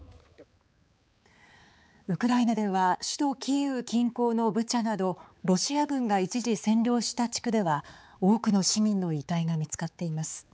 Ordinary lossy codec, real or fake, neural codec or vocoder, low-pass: none; fake; codec, 16 kHz, 4 kbps, X-Codec, HuBERT features, trained on balanced general audio; none